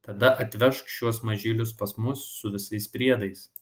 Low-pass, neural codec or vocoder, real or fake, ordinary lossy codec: 19.8 kHz; none; real; Opus, 24 kbps